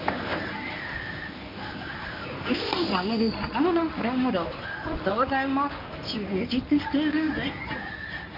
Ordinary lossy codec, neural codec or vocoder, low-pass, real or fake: AAC, 48 kbps; codec, 24 kHz, 0.9 kbps, WavTokenizer, medium speech release version 1; 5.4 kHz; fake